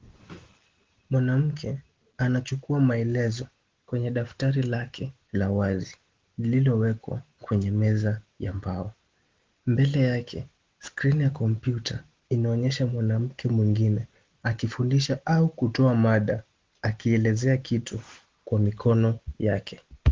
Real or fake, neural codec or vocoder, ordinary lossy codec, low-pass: real; none; Opus, 16 kbps; 7.2 kHz